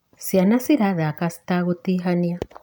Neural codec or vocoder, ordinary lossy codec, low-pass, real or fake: none; none; none; real